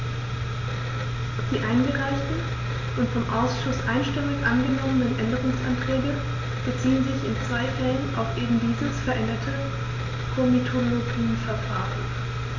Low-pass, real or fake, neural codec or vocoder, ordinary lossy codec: 7.2 kHz; real; none; MP3, 64 kbps